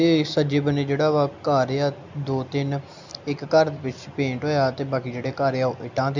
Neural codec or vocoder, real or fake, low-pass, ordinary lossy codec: none; real; 7.2 kHz; MP3, 64 kbps